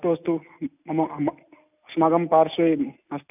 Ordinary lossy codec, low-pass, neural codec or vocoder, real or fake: none; 3.6 kHz; none; real